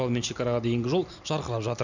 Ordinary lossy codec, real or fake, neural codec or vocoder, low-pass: none; real; none; 7.2 kHz